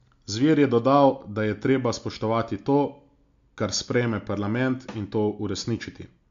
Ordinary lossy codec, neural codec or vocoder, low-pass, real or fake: AAC, 64 kbps; none; 7.2 kHz; real